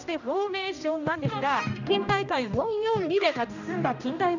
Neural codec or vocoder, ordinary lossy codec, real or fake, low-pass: codec, 16 kHz, 1 kbps, X-Codec, HuBERT features, trained on general audio; none; fake; 7.2 kHz